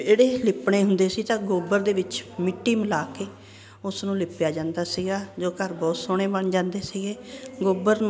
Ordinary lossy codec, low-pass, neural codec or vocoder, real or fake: none; none; none; real